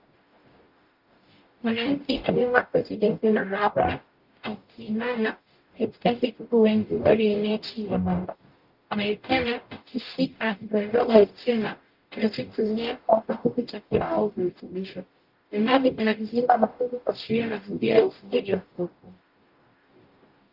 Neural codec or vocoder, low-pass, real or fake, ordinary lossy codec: codec, 44.1 kHz, 0.9 kbps, DAC; 5.4 kHz; fake; Opus, 32 kbps